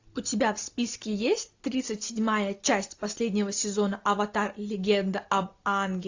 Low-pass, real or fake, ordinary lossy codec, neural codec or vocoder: 7.2 kHz; real; AAC, 48 kbps; none